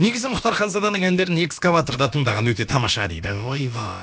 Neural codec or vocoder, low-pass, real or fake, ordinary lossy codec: codec, 16 kHz, about 1 kbps, DyCAST, with the encoder's durations; none; fake; none